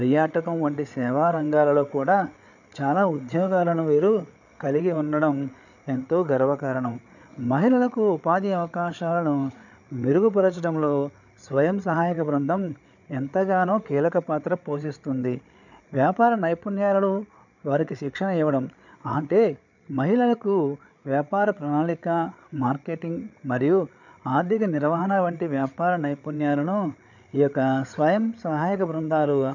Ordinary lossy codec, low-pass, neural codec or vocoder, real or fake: none; 7.2 kHz; codec, 16 kHz, 8 kbps, FreqCodec, larger model; fake